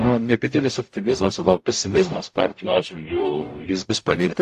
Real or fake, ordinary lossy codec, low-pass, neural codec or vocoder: fake; AAC, 64 kbps; 14.4 kHz; codec, 44.1 kHz, 0.9 kbps, DAC